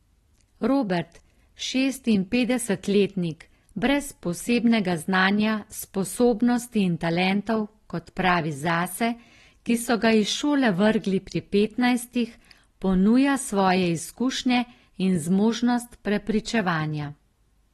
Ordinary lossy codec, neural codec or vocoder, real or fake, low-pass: AAC, 32 kbps; none; real; 19.8 kHz